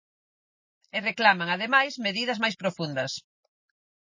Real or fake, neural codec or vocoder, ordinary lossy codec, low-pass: real; none; MP3, 32 kbps; 7.2 kHz